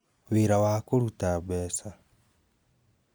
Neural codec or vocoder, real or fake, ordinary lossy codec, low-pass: none; real; none; none